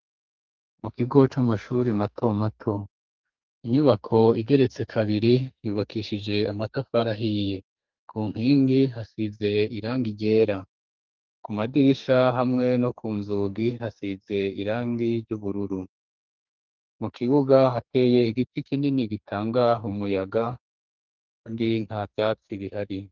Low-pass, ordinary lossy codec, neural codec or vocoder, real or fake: 7.2 kHz; Opus, 32 kbps; codec, 44.1 kHz, 2.6 kbps, SNAC; fake